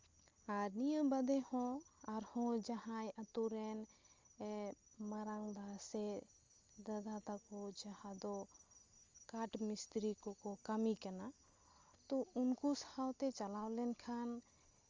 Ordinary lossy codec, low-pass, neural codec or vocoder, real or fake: Opus, 32 kbps; 7.2 kHz; none; real